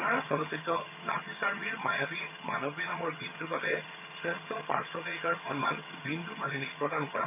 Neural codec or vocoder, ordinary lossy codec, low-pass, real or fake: vocoder, 22.05 kHz, 80 mel bands, HiFi-GAN; MP3, 32 kbps; 3.6 kHz; fake